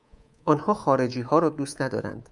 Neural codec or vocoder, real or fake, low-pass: codec, 24 kHz, 3.1 kbps, DualCodec; fake; 10.8 kHz